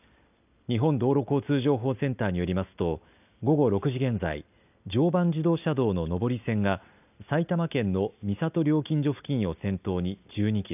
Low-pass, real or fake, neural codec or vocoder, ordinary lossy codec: 3.6 kHz; real; none; none